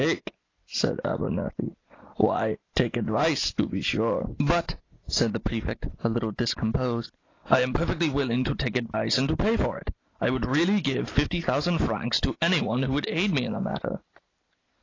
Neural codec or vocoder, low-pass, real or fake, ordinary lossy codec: none; 7.2 kHz; real; AAC, 32 kbps